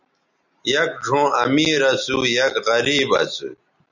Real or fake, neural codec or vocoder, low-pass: real; none; 7.2 kHz